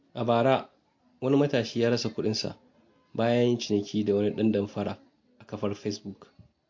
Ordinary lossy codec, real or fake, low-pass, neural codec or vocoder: MP3, 48 kbps; real; 7.2 kHz; none